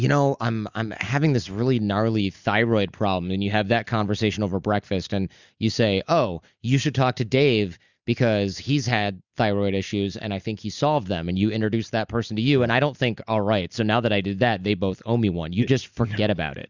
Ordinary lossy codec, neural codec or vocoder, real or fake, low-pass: Opus, 64 kbps; none; real; 7.2 kHz